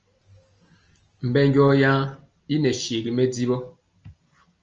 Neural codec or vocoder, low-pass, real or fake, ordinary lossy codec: none; 7.2 kHz; real; Opus, 24 kbps